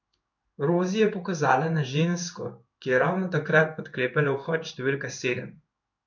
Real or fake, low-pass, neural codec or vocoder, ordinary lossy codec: fake; 7.2 kHz; codec, 16 kHz in and 24 kHz out, 1 kbps, XY-Tokenizer; none